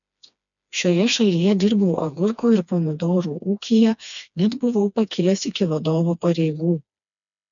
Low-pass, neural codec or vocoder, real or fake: 7.2 kHz; codec, 16 kHz, 2 kbps, FreqCodec, smaller model; fake